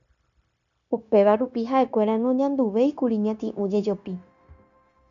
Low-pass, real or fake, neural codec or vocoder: 7.2 kHz; fake; codec, 16 kHz, 0.9 kbps, LongCat-Audio-Codec